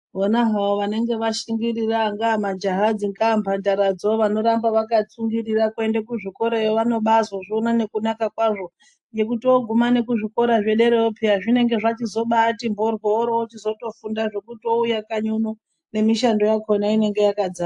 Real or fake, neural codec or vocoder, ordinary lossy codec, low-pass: real; none; AAC, 64 kbps; 10.8 kHz